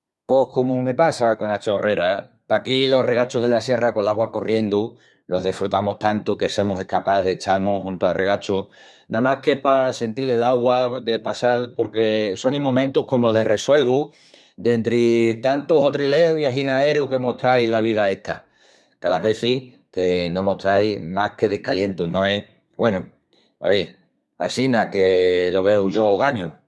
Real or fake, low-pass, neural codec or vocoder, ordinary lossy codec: fake; none; codec, 24 kHz, 1 kbps, SNAC; none